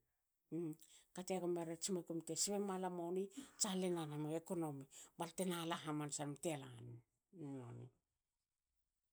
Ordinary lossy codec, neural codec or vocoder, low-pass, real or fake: none; none; none; real